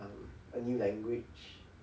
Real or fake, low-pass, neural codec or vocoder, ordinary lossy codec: real; none; none; none